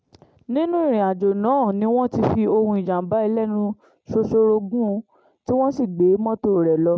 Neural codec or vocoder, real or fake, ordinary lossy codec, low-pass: none; real; none; none